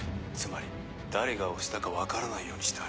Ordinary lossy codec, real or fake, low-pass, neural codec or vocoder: none; real; none; none